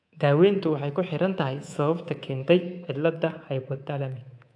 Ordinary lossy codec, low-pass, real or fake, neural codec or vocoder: AAC, 64 kbps; 9.9 kHz; fake; codec, 24 kHz, 3.1 kbps, DualCodec